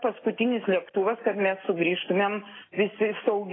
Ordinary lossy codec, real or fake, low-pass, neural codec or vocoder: AAC, 16 kbps; real; 7.2 kHz; none